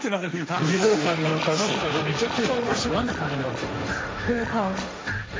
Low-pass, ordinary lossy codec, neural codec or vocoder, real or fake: none; none; codec, 16 kHz, 1.1 kbps, Voila-Tokenizer; fake